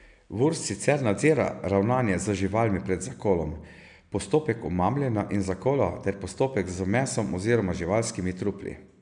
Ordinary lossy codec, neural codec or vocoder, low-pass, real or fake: none; none; 9.9 kHz; real